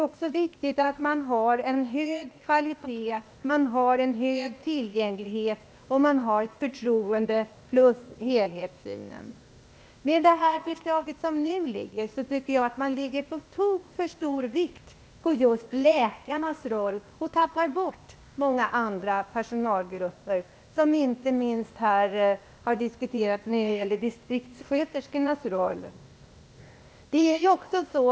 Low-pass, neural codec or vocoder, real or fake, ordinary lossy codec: none; codec, 16 kHz, 0.8 kbps, ZipCodec; fake; none